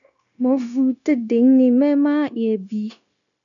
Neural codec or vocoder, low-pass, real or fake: codec, 16 kHz, 0.9 kbps, LongCat-Audio-Codec; 7.2 kHz; fake